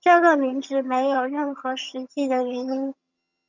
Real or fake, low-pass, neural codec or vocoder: fake; 7.2 kHz; vocoder, 22.05 kHz, 80 mel bands, HiFi-GAN